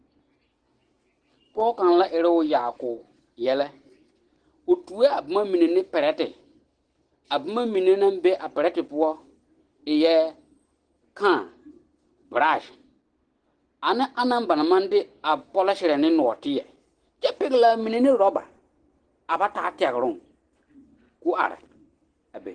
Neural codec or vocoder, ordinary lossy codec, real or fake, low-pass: none; Opus, 16 kbps; real; 9.9 kHz